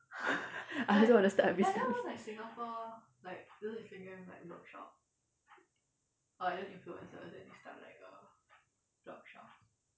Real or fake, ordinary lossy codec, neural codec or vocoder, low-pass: real; none; none; none